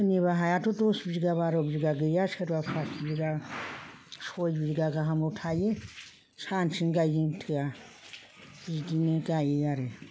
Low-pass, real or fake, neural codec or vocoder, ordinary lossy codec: none; real; none; none